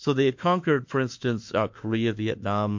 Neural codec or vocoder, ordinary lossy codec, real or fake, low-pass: autoencoder, 48 kHz, 32 numbers a frame, DAC-VAE, trained on Japanese speech; MP3, 48 kbps; fake; 7.2 kHz